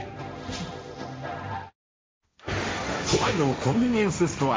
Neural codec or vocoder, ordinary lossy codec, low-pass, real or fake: codec, 16 kHz, 1.1 kbps, Voila-Tokenizer; none; none; fake